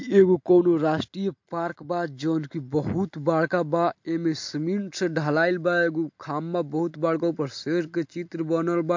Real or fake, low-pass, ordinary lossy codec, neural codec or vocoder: real; 7.2 kHz; MP3, 48 kbps; none